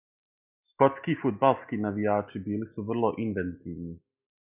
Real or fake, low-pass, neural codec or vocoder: real; 3.6 kHz; none